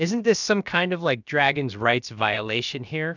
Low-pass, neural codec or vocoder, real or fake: 7.2 kHz; codec, 16 kHz, about 1 kbps, DyCAST, with the encoder's durations; fake